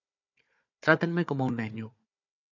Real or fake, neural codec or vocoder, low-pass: fake; codec, 16 kHz, 4 kbps, FunCodec, trained on Chinese and English, 50 frames a second; 7.2 kHz